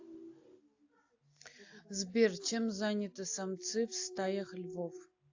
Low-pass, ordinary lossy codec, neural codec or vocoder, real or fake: 7.2 kHz; AAC, 48 kbps; none; real